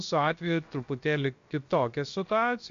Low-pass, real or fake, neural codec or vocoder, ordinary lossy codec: 7.2 kHz; fake; codec, 16 kHz, about 1 kbps, DyCAST, with the encoder's durations; MP3, 48 kbps